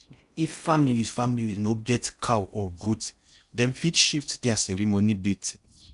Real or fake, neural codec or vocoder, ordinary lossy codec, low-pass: fake; codec, 16 kHz in and 24 kHz out, 0.6 kbps, FocalCodec, streaming, 4096 codes; none; 10.8 kHz